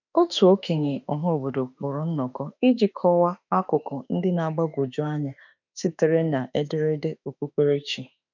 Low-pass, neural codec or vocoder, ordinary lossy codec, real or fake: 7.2 kHz; autoencoder, 48 kHz, 32 numbers a frame, DAC-VAE, trained on Japanese speech; none; fake